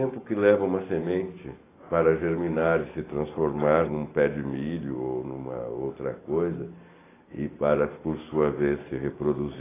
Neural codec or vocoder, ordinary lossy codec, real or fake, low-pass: none; AAC, 16 kbps; real; 3.6 kHz